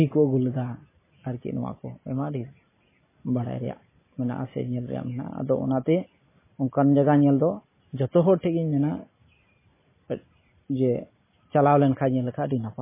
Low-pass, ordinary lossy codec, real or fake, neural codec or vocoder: 3.6 kHz; MP3, 16 kbps; real; none